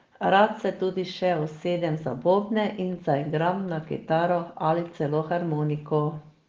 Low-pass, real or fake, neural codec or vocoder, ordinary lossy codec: 7.2 kHz; real; none; Opus, 16 kbps